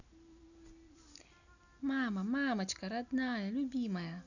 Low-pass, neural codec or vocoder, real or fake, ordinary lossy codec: 7.2 kHz; none; real; none